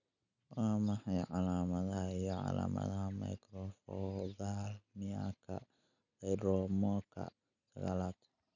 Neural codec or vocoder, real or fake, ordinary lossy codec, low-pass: none; real; none; 7.2 kHz